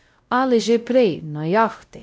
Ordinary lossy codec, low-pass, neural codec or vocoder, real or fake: none; none; codec, 16 kHz, 0.5 kbps, X-Codec, WavLM features, trained on Multilingual LibriSpeech; fake